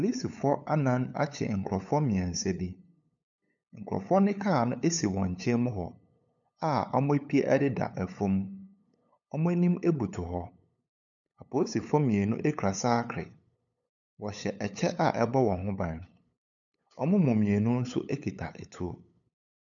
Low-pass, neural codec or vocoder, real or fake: 7.2 kHz; codec, 16 kHz, 8 kbps, FunCodec, trained on LibriTTS, 25 frames a second; fake